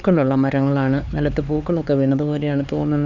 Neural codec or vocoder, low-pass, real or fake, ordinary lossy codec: codec, 16 kHz, 4 kbps, X-Codec, HuBERT features, trained on LibriSpeech; 7.2 kHz; fake; none